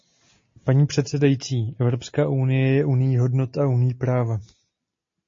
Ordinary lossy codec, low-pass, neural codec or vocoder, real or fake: MP3, 32 kbps; 7.2 kHz; none; real